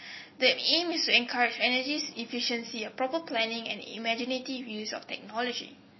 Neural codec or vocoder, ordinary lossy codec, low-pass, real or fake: none; MP3, 24 kbps; 7.2 kHz; real